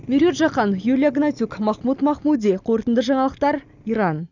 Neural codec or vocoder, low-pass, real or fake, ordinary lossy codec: none; 7.2 kHz; real; none